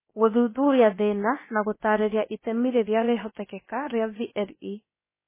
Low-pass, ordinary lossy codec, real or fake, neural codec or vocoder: 3.6 kHz; MP3, 16 kbps; fake; codec, 16 kHz, about 1 kbps, DyCAST, with the encoder's durations